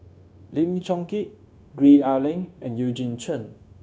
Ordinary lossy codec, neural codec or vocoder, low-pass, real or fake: none; codec, 16 kHz, 0.9 kbps, LongCat-Audio-Codec; none; fake